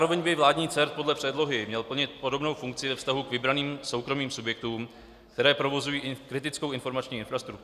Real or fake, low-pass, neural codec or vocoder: real; 14.4 kHz; none